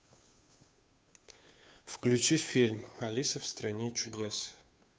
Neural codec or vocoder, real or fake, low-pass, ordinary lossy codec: codec, 16 kHz, 2 kbps, FunCodec, trained on Chinese and English, 25 frames a second; fake; none; none